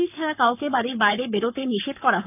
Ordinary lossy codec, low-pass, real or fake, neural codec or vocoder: none; 3.6 kHz; fake; codec, 44.1 kHz, 7.8 kbps, Pupu-Codec